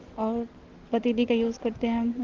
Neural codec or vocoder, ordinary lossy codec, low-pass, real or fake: none; Opus, 16 kbps; 7.2 kHz; real